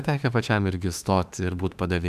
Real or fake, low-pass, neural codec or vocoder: fake; 14.4 kHz; autoencoder, 48 kHz, 32 numbers a frame, DAC-VAE, trained on Japanese speech